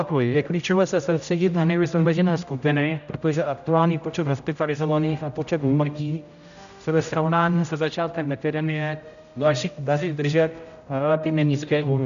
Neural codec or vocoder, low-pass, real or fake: codec, 16 kHz, 0.5 kbps, X-Codec, HuBERT features, trained on general audio; 7.2 kHz; fake